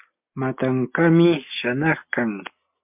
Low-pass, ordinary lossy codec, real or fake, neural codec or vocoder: 3.6 kHz; MP3, 32 kbps; real; none